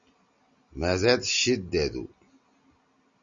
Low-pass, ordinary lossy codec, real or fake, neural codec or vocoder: 7.2 kHz; Opus, 64 kbps; real; none